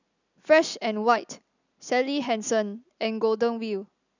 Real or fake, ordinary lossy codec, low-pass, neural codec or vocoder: real; none; 7.2 kHz; none